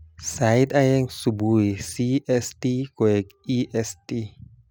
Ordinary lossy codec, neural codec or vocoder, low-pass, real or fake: none; none; none; real